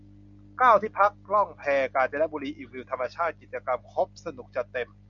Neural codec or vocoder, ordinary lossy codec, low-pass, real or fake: none; MP3, 64 kbps; 7.2 kHz; real